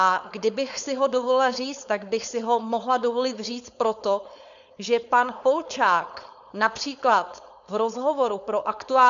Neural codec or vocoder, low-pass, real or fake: codec, 16 kHz, 4.8 kbps, FACodec; 7.2 kHz; fake